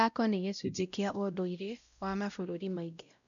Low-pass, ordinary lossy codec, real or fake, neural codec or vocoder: 7.2 kHz; none; fake; codec, 16 kHz, 0.5 kbps, X-Codec, WavLM features, trained on Multilingual LibriSpeech